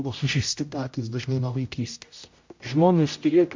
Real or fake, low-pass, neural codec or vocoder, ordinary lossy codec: fake; 7.2 kHz; codec, 16 kHz, 0.5 kbps, X-Codec, HuBERT features, trained on general audio; MP3, 48 kbps